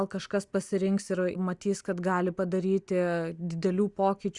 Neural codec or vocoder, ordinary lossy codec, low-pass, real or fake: none; Opus, 64 kbps; 10.8 kHz; real